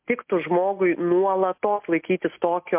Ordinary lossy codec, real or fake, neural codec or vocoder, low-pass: MP3, 32 kbps; real; none; 3.6 kHz